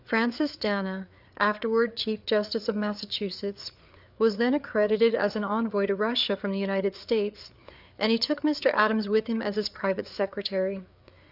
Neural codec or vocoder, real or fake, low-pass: codec, 16 kHz, 4 kbps, FreqCodec, larger model; fake; 5.4 kHz